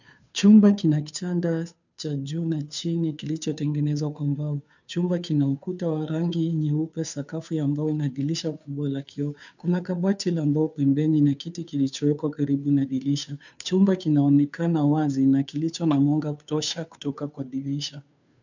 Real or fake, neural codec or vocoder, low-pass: fake; codec, 16 kHz, 2 kbps, FunCodec, trained on Chinese and English, 25 frames a second; 7.2 kHz